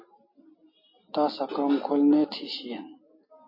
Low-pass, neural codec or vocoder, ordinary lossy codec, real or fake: 5.4 kHz; none; MP3, 24 kbps; real